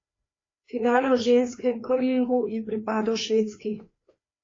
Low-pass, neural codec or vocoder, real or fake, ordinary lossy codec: 7.2 kHz; codec, 16 kHz, 2 kbps, FreqCodec, larger model; fake; AAC, 32 kbps